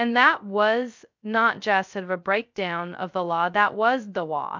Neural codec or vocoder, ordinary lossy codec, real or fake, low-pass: codec, 16 kHz, 0.2 kbps, FocalCodec; MP3, 64 kbps; fake; 7.2 kHz